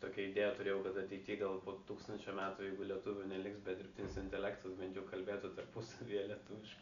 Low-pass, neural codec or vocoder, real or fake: 7.2 kHz; none; real